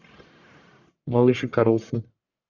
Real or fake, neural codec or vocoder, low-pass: fake; codec, 44.1 kHz, 1.7 kbps, Pupu-Codec; 7.2 kHz